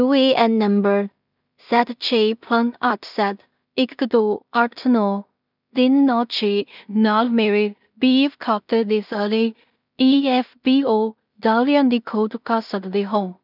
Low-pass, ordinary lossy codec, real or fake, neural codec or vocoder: 5.4 kHz; none; fake; codec, 16 kHz in and 24 kHz out, 0.4 kbps, LongCat-Audio-Codec, two codebook decoder